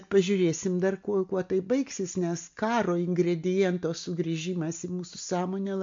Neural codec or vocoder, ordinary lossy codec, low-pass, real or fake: none; MP3, 48 kbps; 7.2 kHz; real